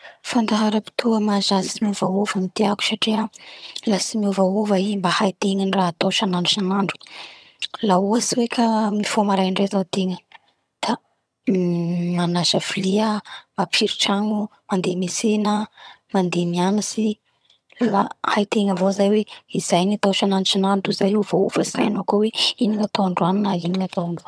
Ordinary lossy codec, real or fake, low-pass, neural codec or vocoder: none; fake; none; vocoder, 22.05 kHz, 80 mel bands, HiFi-GAN